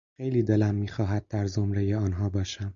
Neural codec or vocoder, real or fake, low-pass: none; real; 7.2 kHz